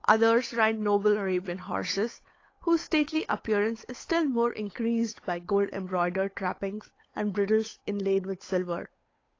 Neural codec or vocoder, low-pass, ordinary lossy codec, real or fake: codec, 16 kHz, 8 kbps, FunCodec, trained on Chinese and English, 25 frames a second; 7.2 kHz; AAC, 32 kbps; fake